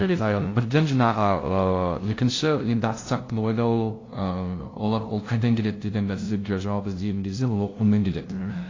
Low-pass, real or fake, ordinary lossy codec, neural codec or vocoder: 7.2 kHz; fake; AAC, 32 kbps; codec, 16 kHz, 0.5 kbps, FunCodec, trained on LibriTTS, 25 frames a second